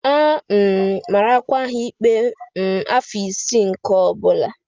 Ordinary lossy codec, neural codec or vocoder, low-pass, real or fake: Opus, 32 kbps; none; 7.2 kHz; real